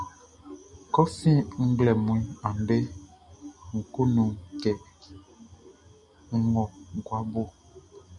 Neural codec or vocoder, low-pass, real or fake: none; 10.8 kHz; real